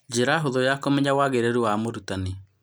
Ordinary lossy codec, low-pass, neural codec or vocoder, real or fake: none; none; none; real